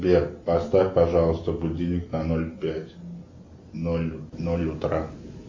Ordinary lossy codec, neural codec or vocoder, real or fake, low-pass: MP3, 48 kbps; none; real; 7.2 kHz